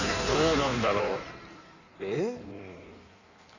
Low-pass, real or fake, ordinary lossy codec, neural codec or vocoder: 7.2 kHz; fake; none; codec, 16 kHz in and 24 kHz out, 1.1 kbps, FireRedTTS-2 codec